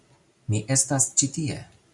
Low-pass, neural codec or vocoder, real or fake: 10.8 kHz; none; real